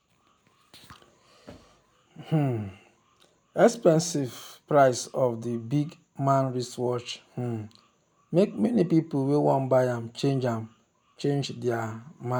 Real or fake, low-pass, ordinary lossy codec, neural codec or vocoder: real; none; none; none